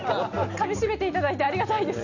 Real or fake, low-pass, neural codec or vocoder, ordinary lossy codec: real; 7.2 kHz; none; none